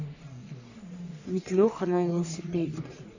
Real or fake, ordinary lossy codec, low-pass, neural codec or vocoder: fake; none; 7.2 kHz; codec, 44.1 kHz, 3.4 kbps, Pupu-Codec